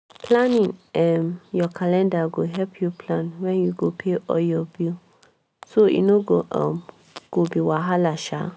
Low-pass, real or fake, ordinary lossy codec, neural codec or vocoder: none; real; none; none